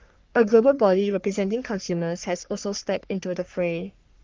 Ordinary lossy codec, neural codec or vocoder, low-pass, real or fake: Opus, 24 kbps; codec, 44.1 kHz, 3.4 kbps, Pupu-Codec; 7.2 kHz; fake